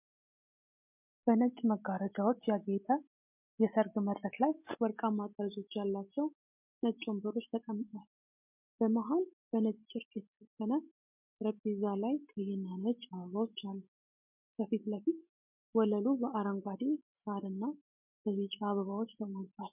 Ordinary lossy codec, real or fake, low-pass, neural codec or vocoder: MP3, 32 kbps; real; 3.6 kHz; none